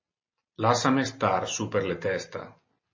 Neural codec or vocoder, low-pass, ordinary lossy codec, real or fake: none; 7.2 kHz; MP3, 32 kbps; real